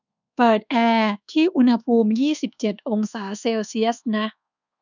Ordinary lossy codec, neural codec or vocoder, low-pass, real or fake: none; codec, 24 kHz, 1.2 kbps, DualCodec; 7.2 kHz; fake